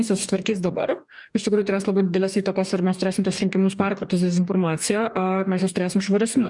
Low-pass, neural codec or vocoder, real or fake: 10.8 kHz; codec, 44.1 kHz, 2.6 kbps, DAC; fake